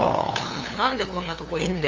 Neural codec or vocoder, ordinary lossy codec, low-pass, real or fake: codec, 16 kHz, 2 kbps, FunCodec, trained on LibriTTS, 25 frames a second; Opus, 32 kbps; 7.2 kHz; fake